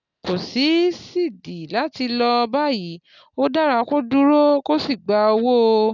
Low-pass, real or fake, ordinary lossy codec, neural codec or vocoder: 7.2 kHz; real; none; none